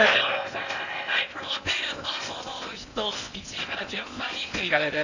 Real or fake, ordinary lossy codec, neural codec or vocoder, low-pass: fake; none; codec, 16 kHz in and 24 kHz out, 0.8 kbps, FocalCodec, streaming, 65536 codes; 7.2 kHz